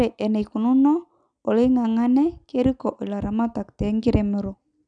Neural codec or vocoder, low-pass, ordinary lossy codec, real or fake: none; 9.9 kHz; none; real